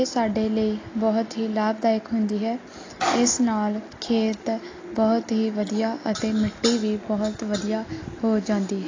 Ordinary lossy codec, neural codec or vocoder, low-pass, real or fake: AAC, 32 kbps; none; 7.2 kHz; real